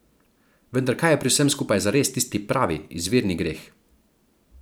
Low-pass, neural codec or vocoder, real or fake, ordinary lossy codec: none; vocoder, 44.1 kHz, 128 mel bands every 512 samples, BigVGAN v2; fake; none